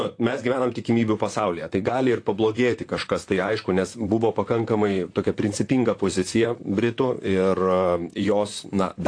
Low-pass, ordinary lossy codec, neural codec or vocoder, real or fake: 9.9 kHz; AAC, 48 kbps; vocoder, 44.1 kHz, 128 mel bands, Pupu-Vocoder; fake